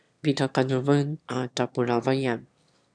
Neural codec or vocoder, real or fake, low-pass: autoencoder, 22.05 kHz, a latent of 192 numbers a frame, VITS, trained on one speaker; fake; 9.9 kHz